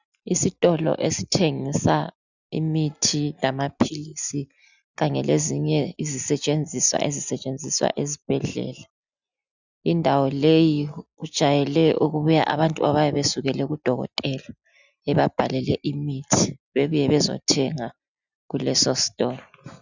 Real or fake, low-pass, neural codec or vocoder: real; 7.2 kHz; none